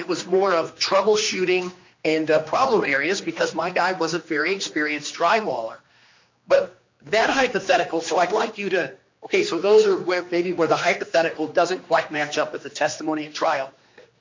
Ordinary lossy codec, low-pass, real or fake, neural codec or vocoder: MP3, 64 kbps; 7.2 kHz; fake; codec, 16 kHz, 2 kbps, X-Codec, HuBERT features, trained on general audio